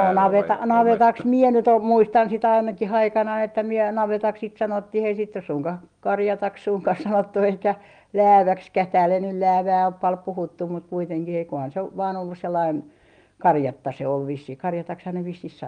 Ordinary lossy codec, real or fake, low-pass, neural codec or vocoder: Opus, 32 kbps; real; 9.9 kHz; none